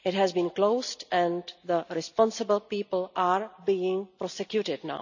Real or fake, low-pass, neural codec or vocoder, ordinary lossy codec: real; 7.2 kHz; none; none